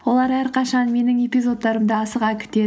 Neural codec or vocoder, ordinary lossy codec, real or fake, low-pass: none; none; real; none